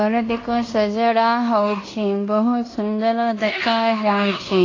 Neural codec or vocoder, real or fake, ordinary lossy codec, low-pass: codec, 16 kHz in and 24 kHz out, 0.9 kbps, LongCat-Audio-Codec, fine tuned four codebook decoder; fake; AAC, 32 kbps; 7.2 kHz